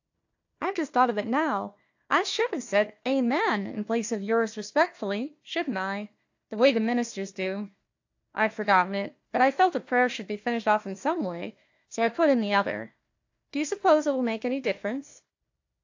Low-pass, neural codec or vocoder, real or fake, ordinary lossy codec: 7.2 kHz; codec, 16 kHz, 1 kbps, FunCodec, trained on Chinese and English, 50 frames a second; fake; AAC, 48 kbps